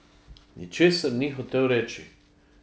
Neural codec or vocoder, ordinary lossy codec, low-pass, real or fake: none; none; none; real